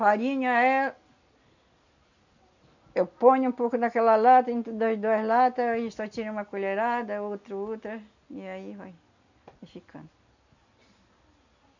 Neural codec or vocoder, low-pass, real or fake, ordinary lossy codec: none; 7.2 kHz; real; none